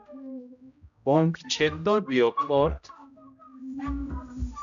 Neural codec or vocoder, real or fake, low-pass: codec, 16 kHz, 0.5 kbps, X-Codec, HuBERT features, trained on general audio; fake; 7.2 kHz